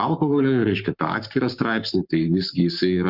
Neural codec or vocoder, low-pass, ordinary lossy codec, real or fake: codec, 44.1 kHz, 7.8 kbps, Pupu-Codec; 5.4 kHz; Opus, 64 kbps; fake